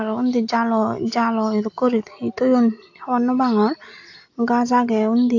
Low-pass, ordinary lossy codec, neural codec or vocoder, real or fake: 7.2 kHz; AAC, 48 kbps; none; real